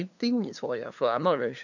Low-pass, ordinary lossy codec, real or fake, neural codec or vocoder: 7.2 kHz; none; fake; codec, 16 kHz, 2 kbps, FunCodec, trained on LibriTTS, 25 frames a second